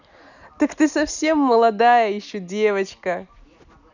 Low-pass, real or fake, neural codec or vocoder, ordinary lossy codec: 7.2 kHz; real; none; none